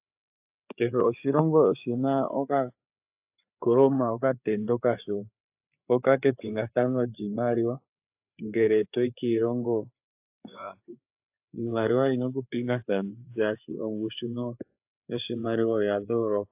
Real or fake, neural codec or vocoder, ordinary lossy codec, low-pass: fake; codec, 16 kHz, 4 kbps, FreqCodec, larger model; AAC, 32 kbps; 3.6 kHz